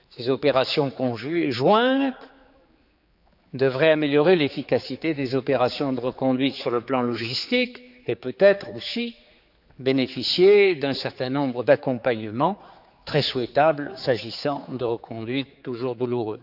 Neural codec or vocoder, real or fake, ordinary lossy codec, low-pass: codec, 16 kHz, 4 kbps, X-Codec, HuBERT features, trained on general audio; fake; none; 5.4 kHz